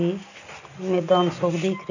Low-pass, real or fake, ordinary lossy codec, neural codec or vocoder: 7.2 kHz; real; none; none